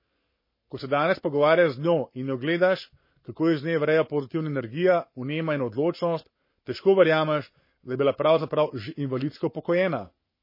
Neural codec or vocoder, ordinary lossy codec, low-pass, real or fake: codec, 44.1 kHz, 7.8 kbps, Pupu-Codec; MP3, 24 kbps; 5.4 kHz; fake